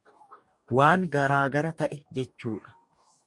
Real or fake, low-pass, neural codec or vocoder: fake; 10.8 kHz; codec, 44.1 kHz, 2.6 kbps, DAC